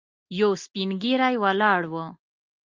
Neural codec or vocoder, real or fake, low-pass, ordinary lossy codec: none; real; 7.2 kHz; Opus, 32 kbps